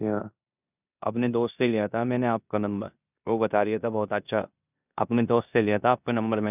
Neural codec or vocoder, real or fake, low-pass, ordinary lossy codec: codec, 16 kHz in and 24 kHz out, 0.9 kbps, LongCat-Audio-Codec, four codebook decoder; fake; 3.6 kHz; none